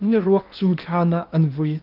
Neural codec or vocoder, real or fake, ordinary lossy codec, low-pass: codec, 16 kHz in and 24 kHz out, 0.8 kbps, FocalCodec, streaming, 65536 codes; fake; Opus, 32 kbps; 5.4 kHz